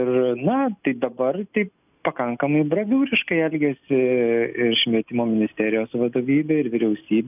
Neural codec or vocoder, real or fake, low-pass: none; real; 3.6 kHz